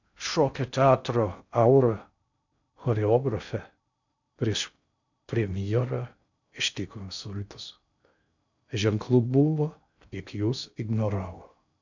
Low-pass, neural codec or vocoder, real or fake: 7.2 kHz; codec, 16 kHz in and 24 kHz out, 0.6 kbps, FocalCodec, streaming, 2048 codes; fake